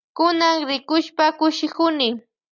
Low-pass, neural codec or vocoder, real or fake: 7.2 kHz; none; real